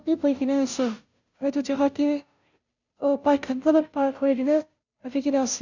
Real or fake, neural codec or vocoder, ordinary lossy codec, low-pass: fake; codec, 16 kHz, 0.5 kbps, FunCodec, trained on Chinese and English, 25 frames a second; none; 7.2 kHz